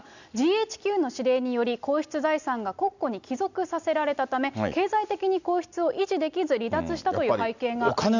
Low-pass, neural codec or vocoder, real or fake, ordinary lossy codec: 7.2 kHz; none; real; none